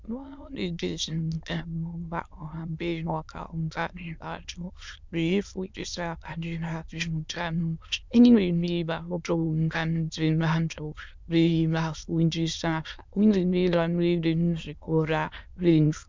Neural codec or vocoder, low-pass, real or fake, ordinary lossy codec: autoencoder, 22.05 kHz, a latent of 192 numbers a frame, VITS, trained on many speakers; 7.2 kHz; fake; MP3, 64 kbps